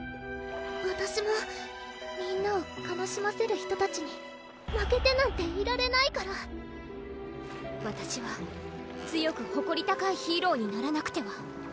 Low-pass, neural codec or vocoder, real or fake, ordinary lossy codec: none; none; real; none